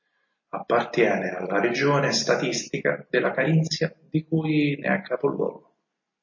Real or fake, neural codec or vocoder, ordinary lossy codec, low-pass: real; none; MP3, 32 kbps; 7.2 kHz